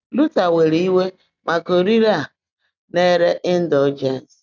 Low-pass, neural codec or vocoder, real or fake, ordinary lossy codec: 7.2 kHz; none; real; none